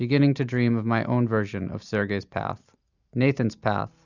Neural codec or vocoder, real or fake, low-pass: none; real; 7.2 kHz